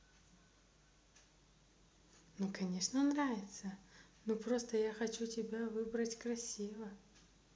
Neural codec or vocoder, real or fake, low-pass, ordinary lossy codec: none; real; none; none